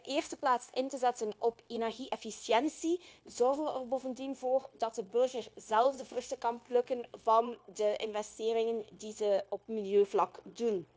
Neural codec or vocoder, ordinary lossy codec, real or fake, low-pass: codec, 16 kHz, 0.9 kbps, LongCat-Audio-Codec; none; fake; none